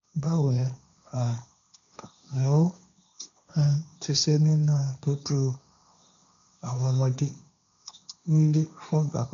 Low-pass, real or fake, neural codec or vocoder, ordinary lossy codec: 7.2 kHz; fake; codec, 16 kHz, 1.1 kbps, Voila-Tokenizer; none